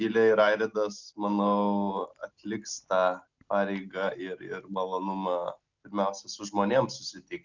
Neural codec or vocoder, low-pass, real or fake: none; 7.2 kHz; real